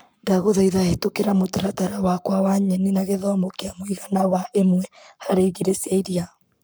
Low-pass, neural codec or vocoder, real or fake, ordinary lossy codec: none; codec, 44.1 kHz, 7.8 kbps, Pupu-Codec; fake; none